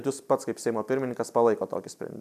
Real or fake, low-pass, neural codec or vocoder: real; 14.4 kHz; none